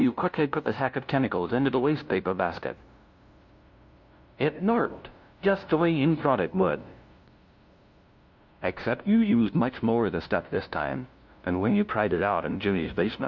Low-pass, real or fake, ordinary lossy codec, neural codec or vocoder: 7.2 kHz; fake; MP3, 48 kbps; codec, 16 kHz, 0.5 kbps, FunCodec, trained on LibriTTS, 25 frames a second